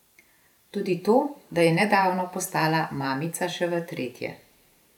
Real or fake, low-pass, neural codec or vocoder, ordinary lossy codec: fake; 19.8 kHz; vocoder, 44.1 kHz, 128 mel bands every 512 samples, BigVGAN v2; none